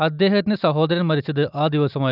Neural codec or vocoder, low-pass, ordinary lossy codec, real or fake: none; 5.4 kHz; none; real